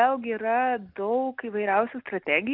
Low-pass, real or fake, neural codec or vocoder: 5.4 kHz; real; none